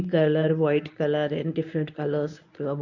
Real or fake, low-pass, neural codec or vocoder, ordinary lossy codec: fake; 7.2 kHz; codec, 24 kHz, 0.9 kbps, WavTokenizer, medium speech release version 1; none